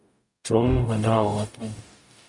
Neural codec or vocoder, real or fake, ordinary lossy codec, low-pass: codec, 44.1 kHz, 0.9 kbps, DAC; fake; MP3, 96 kbps; 10.8 kHz